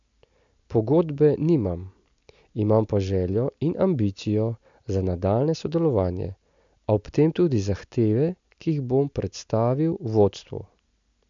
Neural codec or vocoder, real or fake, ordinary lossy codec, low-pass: none; real; MP3, 64 kbps; 7.2 kHz